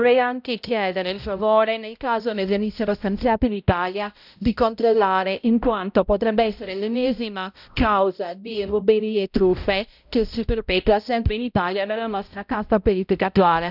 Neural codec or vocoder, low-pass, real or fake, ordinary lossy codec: codec, 16 kHz, 0.5 kbps, X-Codec, HuBERT features, trained on balanced general audio; 5.4 kHz; fake; none